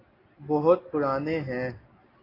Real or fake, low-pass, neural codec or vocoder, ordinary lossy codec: real; 5.4 kHz; none; AAC, 24 kbps